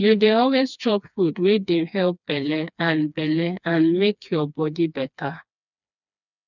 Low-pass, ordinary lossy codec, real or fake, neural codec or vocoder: 7.2 kHz; none; fake; codec, 16 kHz, 2 kbps, FreqCodec, smaller model